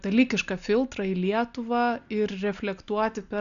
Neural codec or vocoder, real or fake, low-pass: none; real; 7.2 kHz